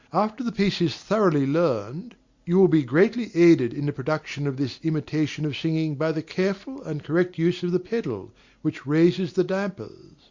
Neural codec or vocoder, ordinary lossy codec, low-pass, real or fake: none; Opus, 64 kbps; 7.2 kHz; real